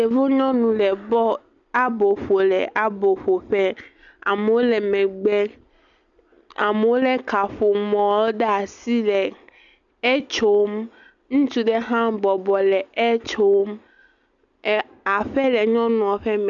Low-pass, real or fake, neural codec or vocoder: 7.2 kHz; real; none